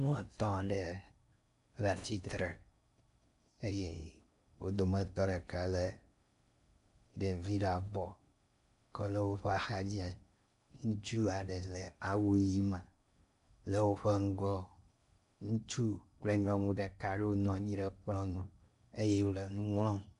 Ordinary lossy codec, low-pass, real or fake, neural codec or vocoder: AAC, 96 kbps; 10.8 kHz; fake; codec, 16 kHz in and 24 kHz out, 0.6 kbps, FocalCodec, streaming, 4096 codes